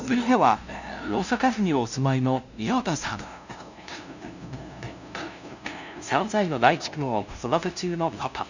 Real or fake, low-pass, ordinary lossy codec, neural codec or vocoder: fake; 7.2 kHz; none; codec, 16 kHz, 0.5 kbps, FunCodec, trained on LibriTTS, 25 frames a second